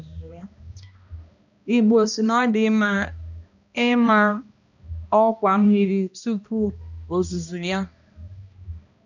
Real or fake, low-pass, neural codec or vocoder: fake; 7.2 kHz; codec, 16 kHz, 1 kbps, X-Codec, HuBERT features, trained on balanced general audio